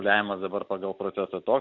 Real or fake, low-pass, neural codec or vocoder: real; 7.2 kHz; none